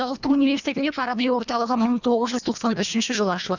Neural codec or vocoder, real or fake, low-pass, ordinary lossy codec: codec, 24 kHz, 1.5 kbps, HILCodec; fake; 7.2 kHz; none